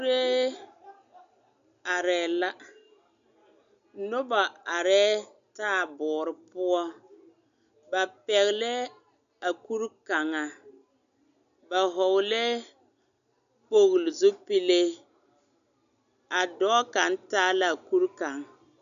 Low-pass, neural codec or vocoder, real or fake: 7.2 kHz; none; real